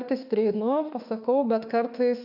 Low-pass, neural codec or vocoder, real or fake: 5.4 kHz; autoencoder, 48 kHz, 32 numbers a frame, DAC-VAE, trained on Japanese speech; fake